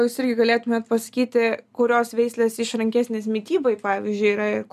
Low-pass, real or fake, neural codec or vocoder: 14.4 kHz; real; none